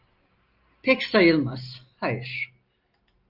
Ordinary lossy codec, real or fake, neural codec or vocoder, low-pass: Opus, 32 kbps; real; none; 5.4 kHz